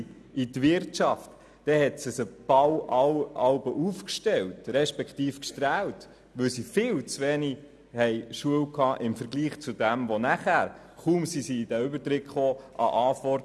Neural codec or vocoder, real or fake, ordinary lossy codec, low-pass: none; real; none; none